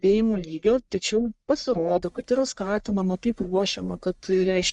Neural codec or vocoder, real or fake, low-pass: codec, 44.1 kHz, 1.7 kbps, Pupu-Codec; fake; 10.8 kHz